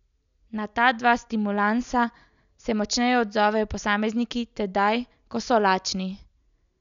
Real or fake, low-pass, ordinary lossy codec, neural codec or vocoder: real; 7.2 kHz; none; none